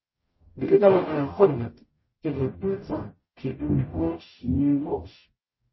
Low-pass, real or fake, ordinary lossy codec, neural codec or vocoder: 7.2 kHz; fake; MP3, 24 kbps; codec, 44.1 kHz, 0.9 kbps, DAC